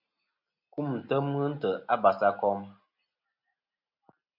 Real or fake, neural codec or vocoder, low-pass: real; none; 5.4 kHz